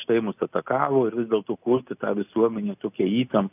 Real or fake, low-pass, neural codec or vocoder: real; 3.6 kHz; none